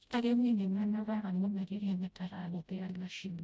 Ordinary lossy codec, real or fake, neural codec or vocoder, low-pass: none; fake; codec, 16 kHz, 0.5 kbps, FreqCodec, smaller model; none